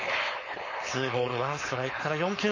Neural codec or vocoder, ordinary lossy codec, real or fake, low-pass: codec, 16 kHz, 4.8 kbps, FACodec; MP3, 32 kbps; fake; 7.2 kHz